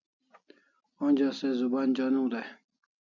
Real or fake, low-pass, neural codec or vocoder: real; 7.2 kHz; none